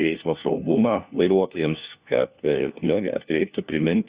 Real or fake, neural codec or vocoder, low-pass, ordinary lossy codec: fake; codec, 16 kHz, 1 kbps, FunCodec, trained on LibriTTS, 50 frames a second; 3.6 kHz; Opus, 64 kbps